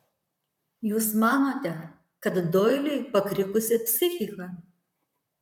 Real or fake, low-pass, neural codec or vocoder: fake; 19.8 kHz; vocoder, 44.1 kHz, 128 mel bands, Pupu-Vocoder